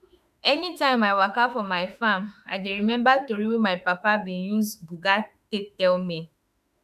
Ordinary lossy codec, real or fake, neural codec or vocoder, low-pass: none; fake; autoencoder, 48 kHz, 32 numbers a frame, DAC-VAE, trained on Japanese speech; 14.4 kHz